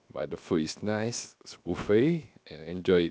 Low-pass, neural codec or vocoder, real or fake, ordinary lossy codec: none; codec, 16 kHz, 0.7 kbps, FocalCodec; fake; none